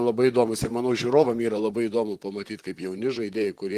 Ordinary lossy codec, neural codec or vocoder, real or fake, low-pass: Opus, 24 kbps; vocoder, 44.1 kHz, 128 mel bands, Pupu-Vocoder; fake; 14.4 kHz